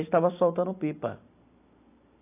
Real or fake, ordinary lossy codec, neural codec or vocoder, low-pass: real; AAC, 32 kbps; none; 3.6 kHz